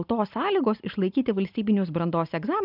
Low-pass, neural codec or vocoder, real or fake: 5.4 kHz; none; real